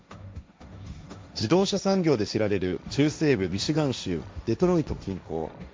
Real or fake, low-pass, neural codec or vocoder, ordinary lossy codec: fake; none; codec, 16 kHz, 1.1 kbps, Voila-Tokenizer; none